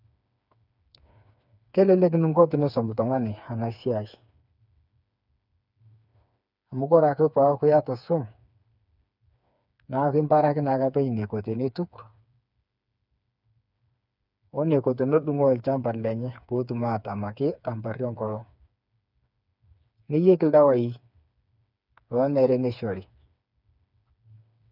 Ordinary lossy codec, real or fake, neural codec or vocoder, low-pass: none; fake; codec, 16 kHz, 4 kbps, FreqCodec, smaller model; 5.4 kHz